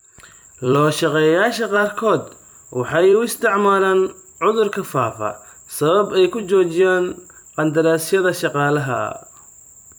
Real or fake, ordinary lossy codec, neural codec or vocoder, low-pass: fake; none; vocoder, 44.1 kHz, 128 mel bands every 256 samples, BigVGAN v2; none